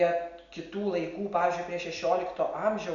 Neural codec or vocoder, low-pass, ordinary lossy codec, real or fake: none; 7.2 kHz; Opus, 64 kbps; real